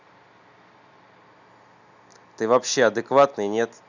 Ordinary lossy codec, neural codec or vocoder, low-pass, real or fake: none; none; 7.2 kHz; real